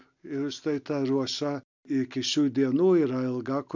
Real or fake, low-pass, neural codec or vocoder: real; 7.2 kHz; none